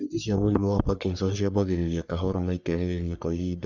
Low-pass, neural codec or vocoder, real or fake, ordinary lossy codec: 7.2 kHz; codec, 44.1 kHz, 3.4 kbps, Pupu-Codec; fake; none